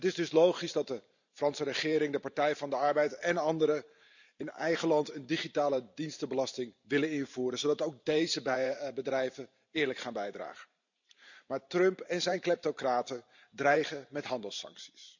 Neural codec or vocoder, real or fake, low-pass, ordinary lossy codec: vocoder, 44.1 kHz, 128 mel bands every 512 samples, BigVGAN v2; fake; 7.2 kHz; none